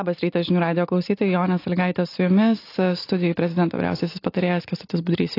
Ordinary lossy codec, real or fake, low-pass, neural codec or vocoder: AAC, 32 kbps; real; 5.4 kHz; none